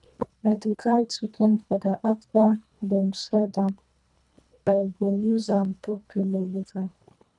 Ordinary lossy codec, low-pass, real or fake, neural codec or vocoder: none; 10.8 kHz; fake; codec, 24 kHz, 1.5 kbps, HILCodec